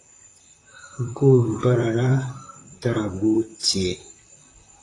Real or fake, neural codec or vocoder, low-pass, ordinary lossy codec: fake; vocoder, 44.1 kHz, 128 mel bands, Pupu-Vocoder; 10.8 kHz; AAC, 32 kbps